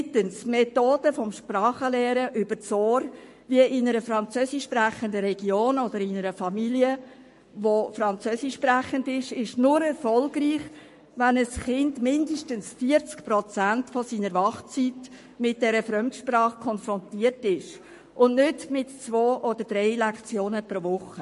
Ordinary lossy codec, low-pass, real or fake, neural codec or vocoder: MP3, 48 kbps; 14.4 kHz; fake; codec, 44.1 kHz, 7.8 kbps, Pupu-Codec